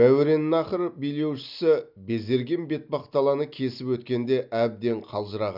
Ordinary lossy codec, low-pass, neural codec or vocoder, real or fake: none; 5.4 kHz; none; real